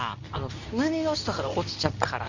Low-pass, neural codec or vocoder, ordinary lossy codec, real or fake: 7.2 kHz; codec, 24 kHz, 0.9 kbps, WavTokenizer, medium speech release version 2; none; fake